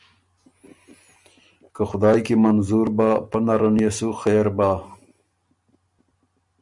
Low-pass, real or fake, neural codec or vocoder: 10.8 kHz; real; none